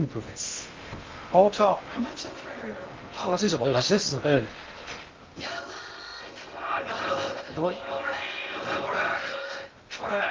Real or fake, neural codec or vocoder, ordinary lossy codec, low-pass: fake; codec, 16 kHz in and 24 kHz out, 0.6 kbps, FocalCodec, streaming, 2048 codes; Opus, 32 kbps; 7.2 kHz